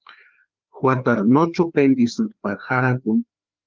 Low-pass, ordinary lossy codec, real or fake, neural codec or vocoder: 7.2 kHz; Opus, 32 kbps; fake; codec, 16 kHz, 2 kbps, FreqCodec, larger model